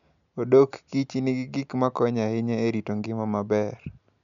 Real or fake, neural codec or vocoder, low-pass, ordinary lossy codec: real; none; 7.2 kHz; none